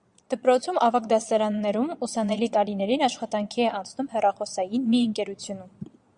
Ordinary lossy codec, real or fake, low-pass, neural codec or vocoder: Opus, 64 kbps; fake; 9.9 kHz; vocoder, 22.05 kHz, 80 mel bands, Vocos